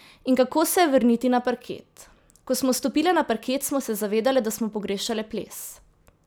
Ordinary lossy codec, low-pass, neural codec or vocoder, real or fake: none; none; none; real